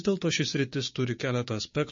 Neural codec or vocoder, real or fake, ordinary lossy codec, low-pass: codec, 16 kHz, 6 kbps, DAC; fake; MP3, 32 kbps; 7.2 kHz